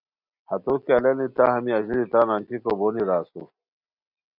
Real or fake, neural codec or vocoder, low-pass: real; none; 5.4 kHz